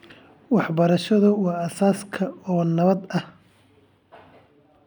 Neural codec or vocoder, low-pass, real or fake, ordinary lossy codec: none; 19.8 kHz; real; none